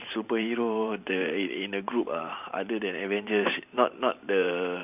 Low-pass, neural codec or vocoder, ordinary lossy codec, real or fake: 3.6 kHz; vocoder, 44.1 kHz, 128 mel bands every 512 samples, BigVGAN v2; none; fake